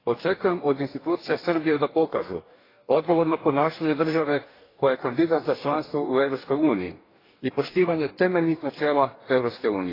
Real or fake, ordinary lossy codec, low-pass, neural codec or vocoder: fake; AAC, 24 kbps; 5.4 kHz; codec, 44.1 kHz, 2.6 kbps, DAC